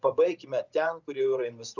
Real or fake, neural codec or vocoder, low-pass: fake; vocoder, 24 kHz, 100 mel bands, Vocos; 7.2 kHz